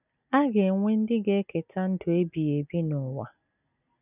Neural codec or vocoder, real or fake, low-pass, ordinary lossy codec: none; real; 3.6 kHz; none